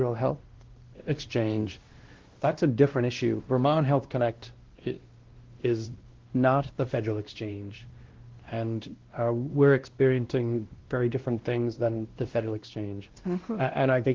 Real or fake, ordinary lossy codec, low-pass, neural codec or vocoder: fake; Opus, 16 kbps; 7.2 kHz; codec, 16 kHz, 1 kbps, X-Codec, WavLM features, trained on Multilingual LibriSpeech